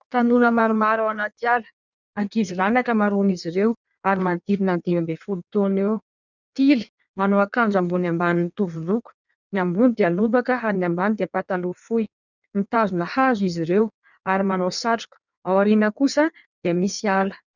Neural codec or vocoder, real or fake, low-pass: codec, 16 kHz in and 24 kHz out, 1.1 kbps, FireRedTTS-2 codec; fake; 7.2 kHz